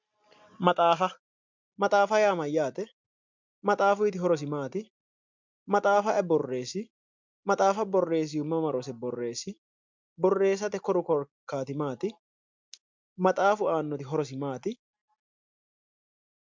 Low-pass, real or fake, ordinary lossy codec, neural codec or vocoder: 7.2 kHz; real; MP3, 64 kbps; none